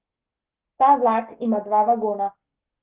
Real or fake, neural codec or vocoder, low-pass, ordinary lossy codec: fake; codec, 44.1 kHz, 7.8 kbps, Pupu-Codec; 3.6 kHz; Opus, 16 kbps